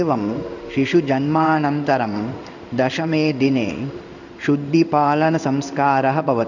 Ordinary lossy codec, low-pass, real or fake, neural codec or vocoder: none; 7.2 kHz; fake; codec, 16 kHz in and 24 kHz out, 1 kbps, XY-Tokenizer